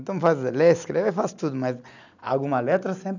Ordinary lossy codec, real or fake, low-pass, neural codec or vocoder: none; real; 7.2 kHz; none